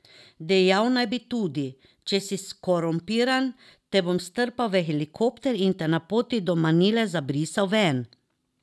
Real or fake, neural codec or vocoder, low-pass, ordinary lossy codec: real; none; none; none